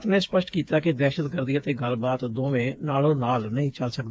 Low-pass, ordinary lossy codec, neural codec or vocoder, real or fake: none; none; codec, 16 kHz, 4 kbps, FreqCodec, smaller model; fake